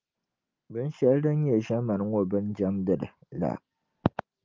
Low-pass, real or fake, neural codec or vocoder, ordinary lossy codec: 7.2 kHz; real; none; Opus, 24 kbps